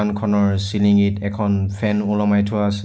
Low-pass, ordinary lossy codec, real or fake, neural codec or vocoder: none; none; real; none